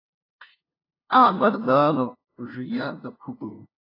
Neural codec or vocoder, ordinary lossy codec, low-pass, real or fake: codec, 16 kHz, 0.5 kbps, FunCodec, trained on LibriTTS, 25 frames a second; AAC, 24 kbps; 5.4 kHz; fake